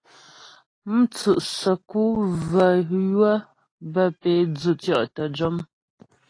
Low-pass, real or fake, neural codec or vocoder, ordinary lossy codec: 9.9 kHz; real; none; AAC, 32 kbps